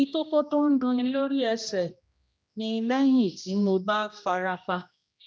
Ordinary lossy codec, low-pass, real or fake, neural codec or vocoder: none; none; fake; codec, 16 kHz, 1 kbps, X-Codec, HuBERT features, trained on general audio